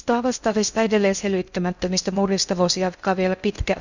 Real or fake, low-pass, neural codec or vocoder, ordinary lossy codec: fake; 7.2 kHz; codec, 16 kHz in and 24 kHz out, 0.6 kbps, FocalCodec, streaming, 2048 codes; none